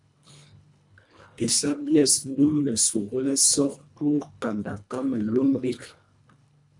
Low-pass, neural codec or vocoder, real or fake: 10.8 kHz; codec, 24 kHz, 1.5 kbps, HILCodec; fake